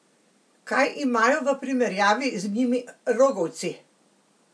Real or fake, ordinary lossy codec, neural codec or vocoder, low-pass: real; none; none; none